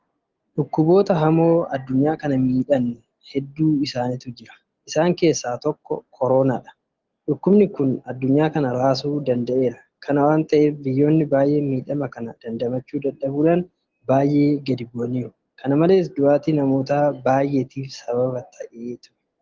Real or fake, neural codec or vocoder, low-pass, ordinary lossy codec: real; none; 7.2 kHz; Opus, 16 kbps